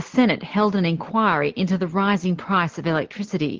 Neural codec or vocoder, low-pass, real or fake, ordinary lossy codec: none; 7.2 kHz; real; Opus, 16 kbps